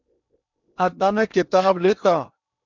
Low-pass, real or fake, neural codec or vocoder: 7.2 kHz; fake; codec, 16 kHz in and 24 kHz out, 0.8 kbps, FocalCodec, streaming, 65536 codes